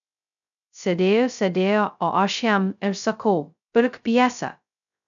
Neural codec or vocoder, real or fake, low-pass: codec, 16 kHz, 0.2 kbps, FocalCodec; fake; 7.2 kHz